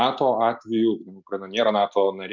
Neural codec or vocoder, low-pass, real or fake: none; 7.2 kHz; real